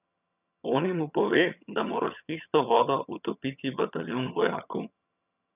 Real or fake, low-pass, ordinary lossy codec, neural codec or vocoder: fake; 3.6 kHz; none; vocoder, 22.05 kHz, 80 mel bands, HiFi-GAN